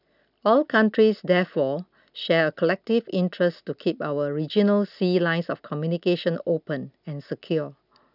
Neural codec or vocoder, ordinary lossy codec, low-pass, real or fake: none; none; 5.4 kHz; real